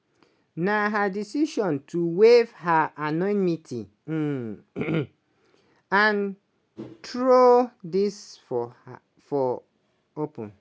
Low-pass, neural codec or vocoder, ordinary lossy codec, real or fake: none; none; none; real